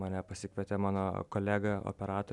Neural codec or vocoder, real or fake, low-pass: none; real; 10.8 kHz